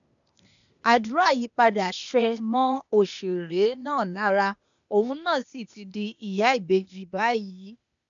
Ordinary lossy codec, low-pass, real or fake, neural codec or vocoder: none; 7.2 kHz; fake; codec, 16 kHz, 0.8 kbps, ZipCodec